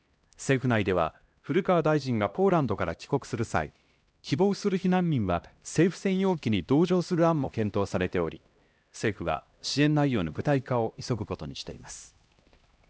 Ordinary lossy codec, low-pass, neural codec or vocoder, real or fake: none; none; codec, 16 kHz, 1 kbps, X-Codec, HuBERT features, trained on LibriSpeech; fake